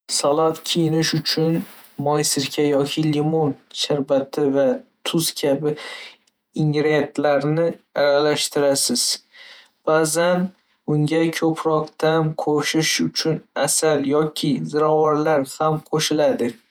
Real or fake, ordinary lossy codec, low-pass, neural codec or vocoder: fake; none; none; vocoder, 48 kHz, 128 mel bands, Vocos